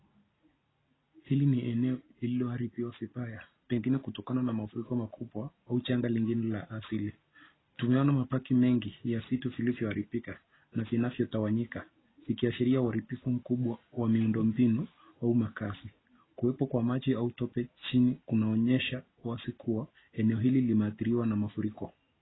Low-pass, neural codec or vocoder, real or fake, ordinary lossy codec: 7.2 kHz; none; real; AAC, 16 kbps